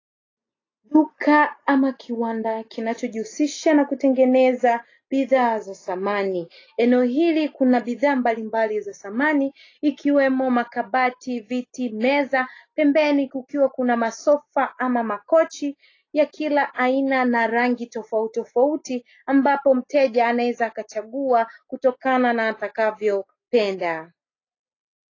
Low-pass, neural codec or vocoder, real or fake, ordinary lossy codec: 7.2 kHz; none; real; AAC, 32 kbps